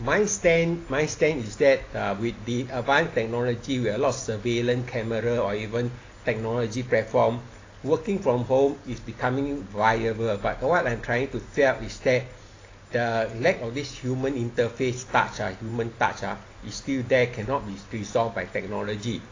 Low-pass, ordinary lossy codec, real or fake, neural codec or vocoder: 7.2 kHz; AAC, 32 kbps; real; none